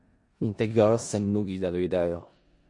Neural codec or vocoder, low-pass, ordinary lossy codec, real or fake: codec, 16 kHz in and 24 kHz out, 0.4 kbps, LongCat-Audio-Codec, four codebook decoder; 10.8 kHz; MP3, 48 kbps; fake